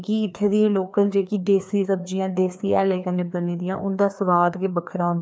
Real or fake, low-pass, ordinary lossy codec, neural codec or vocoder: fake; none; none; codec, 16 kHz, 2 kbps, FreqCodec, larger model